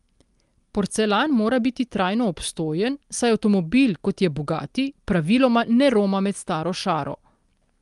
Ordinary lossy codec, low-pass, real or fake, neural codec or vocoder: Opus, 32 kbps; 10.8 kHz; real; none